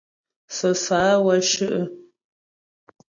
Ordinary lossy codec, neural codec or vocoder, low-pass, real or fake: AAC, 64 kbps; none; 7.2 kHz; real